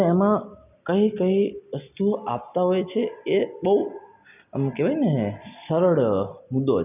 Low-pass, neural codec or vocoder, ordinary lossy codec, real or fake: 3.6 kHz; none; none; real